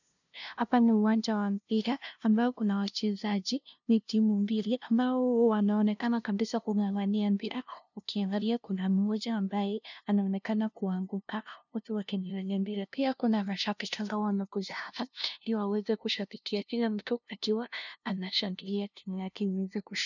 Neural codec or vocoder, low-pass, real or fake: codec, 16 kHz, 0.5 kbps, FunCodec, trained on LibriTTS, 25 frames a second; 7.2 kHz; fake